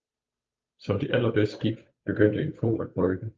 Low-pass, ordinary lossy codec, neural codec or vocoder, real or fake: 7.2 kHz; Opus, 24 kbps; codec, 16 kHz, 8 kbps, FunCodec, trained on Chinese and English, 25 frames a second; fake